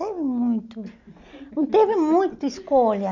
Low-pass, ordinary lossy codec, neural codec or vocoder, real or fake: 7.2 kHz; none; none; real